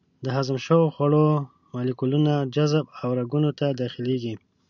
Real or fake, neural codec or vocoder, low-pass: real; none; 7.2 kHz